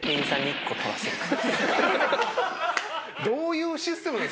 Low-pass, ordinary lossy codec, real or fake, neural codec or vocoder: none; none; real; none